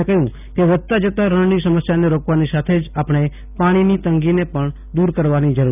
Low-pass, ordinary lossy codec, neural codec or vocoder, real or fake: 3.6 kHz; none; none; real